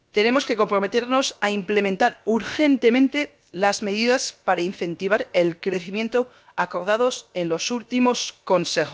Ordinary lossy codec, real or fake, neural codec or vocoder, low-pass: none; fake; codec, 16 kHz, about 1 kbps, DyCAST, with the encoder's durations; none